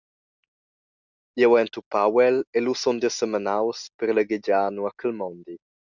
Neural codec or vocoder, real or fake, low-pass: none; real; 7.2 kHz